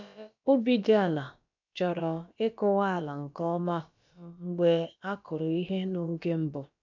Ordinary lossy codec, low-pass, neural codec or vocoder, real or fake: none; 7.2 kHz; codec, 16 kHz, about 1 kbps, DyCAST, with the encoder's durations; fake